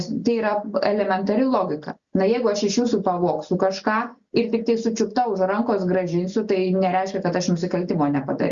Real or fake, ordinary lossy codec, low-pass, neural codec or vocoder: real; Opus, 64 kbps; 7.2 kHz; none